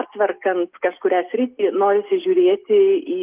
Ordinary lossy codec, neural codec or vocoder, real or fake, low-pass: Opus, 32 kbps; none; real; 3.6 kHz